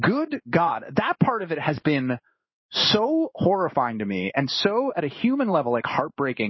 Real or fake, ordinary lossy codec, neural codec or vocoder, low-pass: fake; MP3, 24 kbps; codec, 16 kHz in and 24 kHz out, 1 kbps, XY-Tokenizer; 7.2 kHz